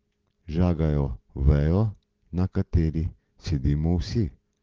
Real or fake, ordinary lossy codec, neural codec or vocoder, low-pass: real; Opus, 16 kbps; none; 7.2 kHz